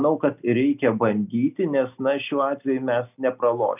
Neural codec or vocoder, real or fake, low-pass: none; real; 3.6 kHz